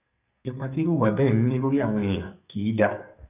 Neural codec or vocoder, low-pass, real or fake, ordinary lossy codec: codec, 44.1 kHz, 2.6 kbps, SNAC; 3.6 kHz; fake; none